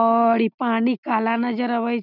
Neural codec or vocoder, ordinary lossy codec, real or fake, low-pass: none; none; real; 5.4 kHz